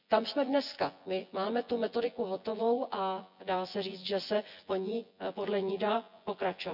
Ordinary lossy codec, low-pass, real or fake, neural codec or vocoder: none; 5.4 kHz; fake; vocoder, 24 kHz, 100 mel bands, Vocos